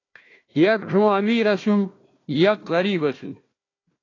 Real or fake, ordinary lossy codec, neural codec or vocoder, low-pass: fake; AAC, 32 kbps; codec, 16 kHz, 1 kbps, FunCodec, trained on Chinese and English, 50 frames a second; 7.2 kHz